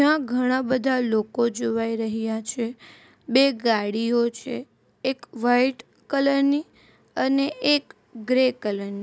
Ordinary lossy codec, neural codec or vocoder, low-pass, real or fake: none; none; none; real